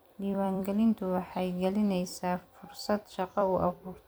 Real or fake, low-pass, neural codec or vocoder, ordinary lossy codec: fake; none; vocoder, 44.1 kHz, 128 mel bands every 256 samples, BigVGAN v2; none